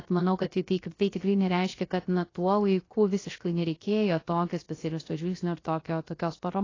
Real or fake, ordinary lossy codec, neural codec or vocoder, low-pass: fake; AAC, 32 kbps; codec, 16 kHz, 0.3 kbps, FocalCodec; 7.2 kHz